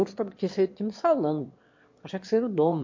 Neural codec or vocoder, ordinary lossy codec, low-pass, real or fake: autoencoder, 22.05 kHz, a latent of 192 numbers a frame, VITS, trained on one speaker; MP3, 48 kbps; 7.2 kHz; fake